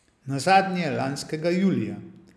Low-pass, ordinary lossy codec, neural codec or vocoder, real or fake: none; none; none; real